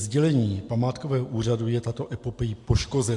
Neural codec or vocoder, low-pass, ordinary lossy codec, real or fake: none; 10.8 kHz; MP3, 64 kbps; real